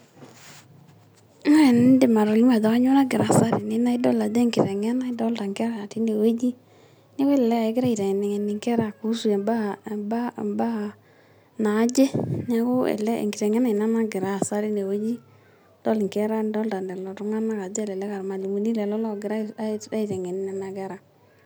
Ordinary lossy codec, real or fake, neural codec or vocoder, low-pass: none; real; none; none